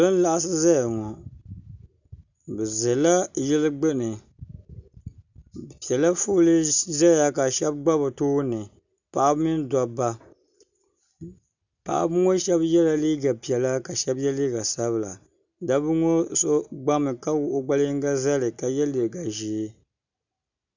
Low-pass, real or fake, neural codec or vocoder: 7.2 kHz; real; none